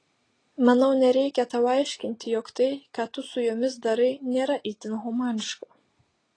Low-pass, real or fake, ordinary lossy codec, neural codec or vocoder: 9.9 kHz; real; AAC, 32 kbps; none